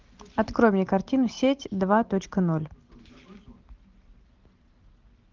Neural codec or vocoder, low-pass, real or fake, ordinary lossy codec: none; 7.2 kHz; real; Opus, 32 kbps